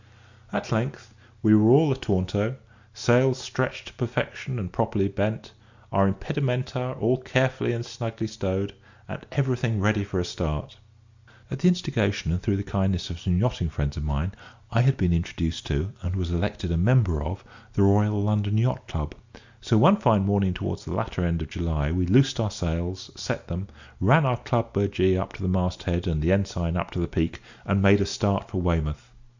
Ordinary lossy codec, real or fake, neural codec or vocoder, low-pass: Opus, 64 kbps; real; none; 7.2 kHz